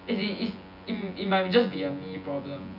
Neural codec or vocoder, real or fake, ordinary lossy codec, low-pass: vocoder, 24 kHz, 100 mel bands, Vocos; fake; none; 5.4 kHz